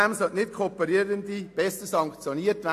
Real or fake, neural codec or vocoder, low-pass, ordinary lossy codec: real; none; 14.4 kHz; AAC, 64 kbps